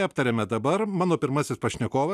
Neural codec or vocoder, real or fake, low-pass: none; real; 14.4 kHz